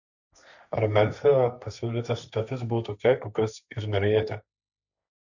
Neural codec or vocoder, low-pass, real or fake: codec, 16 kHz, 1.1 kbps, Voila-Tokenizer; 7.2 kHz; fake